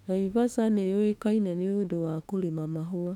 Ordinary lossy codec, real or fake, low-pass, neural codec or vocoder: none; fake; 19.8 kHz; autoencoder, 48 kHz, 32 numbers a frame, DAC-VAE, trained on Japanese speech